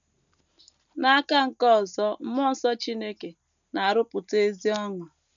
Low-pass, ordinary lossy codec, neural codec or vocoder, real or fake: 7.2 kHz; none; none; real